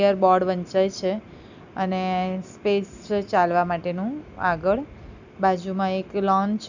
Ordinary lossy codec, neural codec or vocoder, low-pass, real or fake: none; none; 7.2 kHz; real